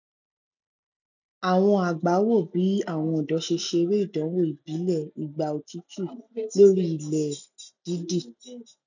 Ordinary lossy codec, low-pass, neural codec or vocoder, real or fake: none; 7.2 kHz; none; real